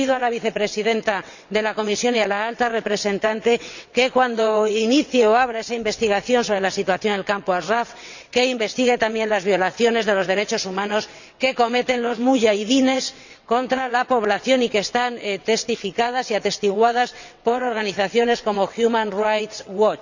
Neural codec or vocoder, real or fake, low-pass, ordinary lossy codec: vocoder, 22.05 kHz, 80 mel bands, WaveNeXt; fake; 7.2 kHz; none